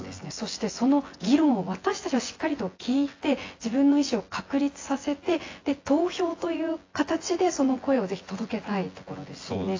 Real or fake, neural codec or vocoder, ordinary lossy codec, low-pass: fake; vocoder, 24 kHz, 100 mel bands, Vocos; AAC, 32 kbps; 7.2 kHz